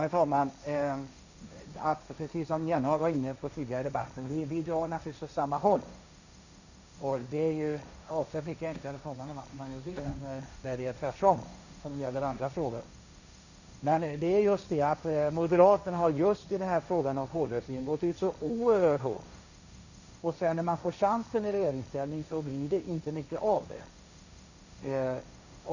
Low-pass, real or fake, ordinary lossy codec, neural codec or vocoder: 7.2 kHz; fake; none; codec, 16 kHz, 1.1 kbps, Voila-Tokenizer